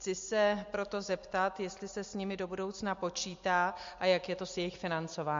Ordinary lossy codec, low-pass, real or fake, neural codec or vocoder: MP3, 48 kbps; 7.2 kHz; real; none